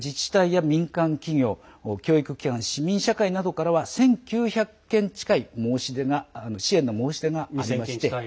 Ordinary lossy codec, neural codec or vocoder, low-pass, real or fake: none; none; none; real